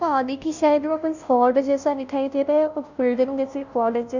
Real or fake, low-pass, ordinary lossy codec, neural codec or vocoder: fake; 7.2 kHz; none; codec, 16 kHz, 0.5 kbps, FunCodec, trained on Chinese and English, 25 frames a second